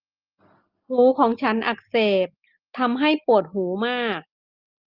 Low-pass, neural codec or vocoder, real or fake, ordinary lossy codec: 5.4 kHz; none; real; Opus, 32 kbps